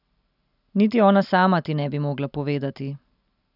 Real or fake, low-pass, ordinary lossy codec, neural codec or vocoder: real; 5.4 kHz; none; none